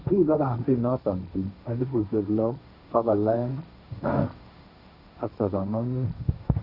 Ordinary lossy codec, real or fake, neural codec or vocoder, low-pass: none; fake; codec, 16 kHz, 1.1 kbps, Voila-Tokenizer; 5.4 kHz